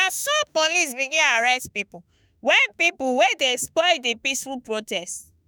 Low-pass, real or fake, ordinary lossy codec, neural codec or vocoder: none; fake; none; autoencoder, 48 kHz, 32 numbers a frame, DAC-VAE, trained on Japanese speech